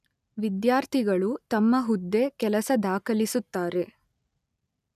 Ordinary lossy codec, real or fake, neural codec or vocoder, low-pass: none; real; none; 14.4 kHz